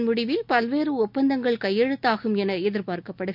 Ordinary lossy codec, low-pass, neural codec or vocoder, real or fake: none; 5.4 kHz; none; real